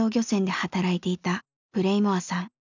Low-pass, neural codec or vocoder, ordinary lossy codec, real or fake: 7.2 kHz; none; none; real